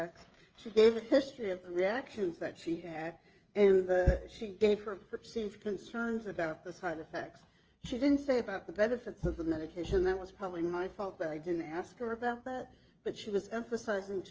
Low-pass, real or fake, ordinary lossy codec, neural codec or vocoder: 7.2 kHz; fake; Opus, 24 kbps; codec, 16 kHz, 16 kbps, FreqCodec, smaller model